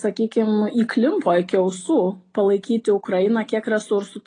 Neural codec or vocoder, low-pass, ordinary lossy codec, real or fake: none; 9.9 kHz; AAC, 32 kbps; real